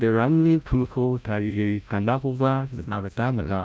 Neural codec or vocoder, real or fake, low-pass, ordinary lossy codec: codec, 16 kHz, 0.5 kbps, FreqCodec, larger model; fake; none; none